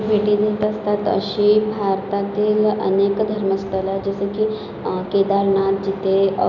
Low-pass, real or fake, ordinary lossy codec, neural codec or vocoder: 7.2 kHz; real; none; none